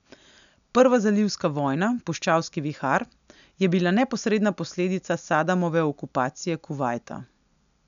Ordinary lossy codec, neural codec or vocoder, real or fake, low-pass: none; none; real; 7.2 kHz